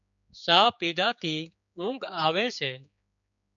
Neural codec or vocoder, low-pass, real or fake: codec, 16 kHz, 4 kbps, X-Codec, HuBERT features, trained on general audio; 7.2 kHz; fake